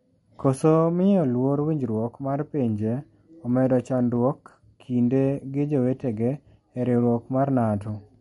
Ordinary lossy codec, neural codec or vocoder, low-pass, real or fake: MP3, 48 kbps; none; 19.8 kHz; real